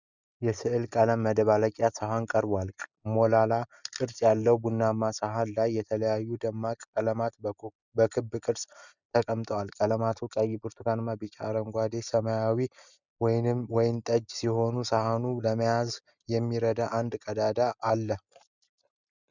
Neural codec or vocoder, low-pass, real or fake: none; 7.2 kHz; real